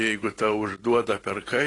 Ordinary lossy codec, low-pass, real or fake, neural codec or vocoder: AAC, 32 kbps; 10.8 kHz; real; none